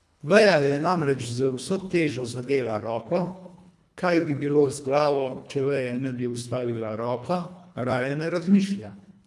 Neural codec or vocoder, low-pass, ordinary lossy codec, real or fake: codec, 24 kHz, 1.5 kbps, HILCodec; none; none; fake